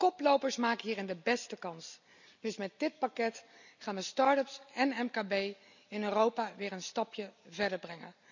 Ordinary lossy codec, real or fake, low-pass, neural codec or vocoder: none; real; 7.2 kHz; none